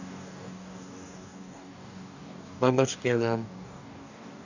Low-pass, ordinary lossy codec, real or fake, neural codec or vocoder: 7.2 kHz; none; fake; codec, 44.1 kHz, 2.6 kbps, DAC